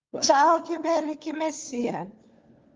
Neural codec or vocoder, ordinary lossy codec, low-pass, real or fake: codec, 16 kHz, 4 kbps, FunCodec, trained on LibriTTS, 50 frames a second; Opus, 32 kbps; 7.2 kHz; fake